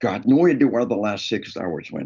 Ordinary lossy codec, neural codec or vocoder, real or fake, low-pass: Opus, 32 kbps; none; real; 7.2 kHz